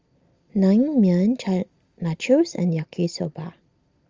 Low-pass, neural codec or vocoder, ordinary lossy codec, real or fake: 7.2 kHz; none; Opus, 32 kbps; real